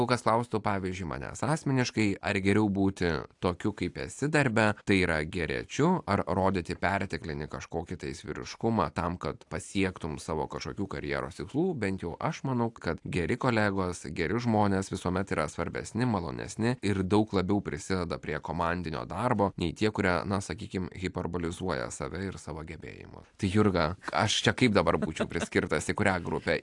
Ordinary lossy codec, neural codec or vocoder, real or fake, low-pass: AAC, 64 kbps; none; real; 10.8 kHz